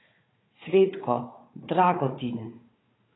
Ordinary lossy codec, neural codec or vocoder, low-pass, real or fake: AAC, 16 kbps; codec, 16 kHz, 4 kbps, FunCodec, trained on Chinese and English, 50 frames a second; 7.2 kHz; fake